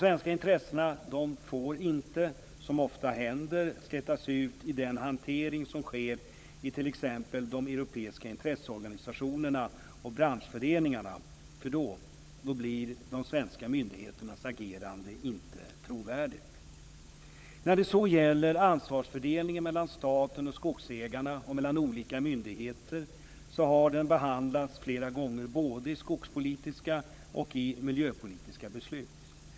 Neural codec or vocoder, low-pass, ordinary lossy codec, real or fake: codec, 16 kHz, 16 kbps, FunCodec, trained on LibriTTS, 50 frames a second; none; none; fake